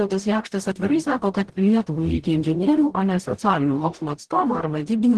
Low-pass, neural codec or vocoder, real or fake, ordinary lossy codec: 10.8 kHz; codec, 44.1 kHz, 0.9 kbps, DAC; fake; Opus, 16 kbps